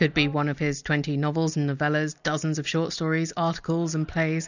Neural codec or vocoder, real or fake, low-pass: none; real; 7.2 kHz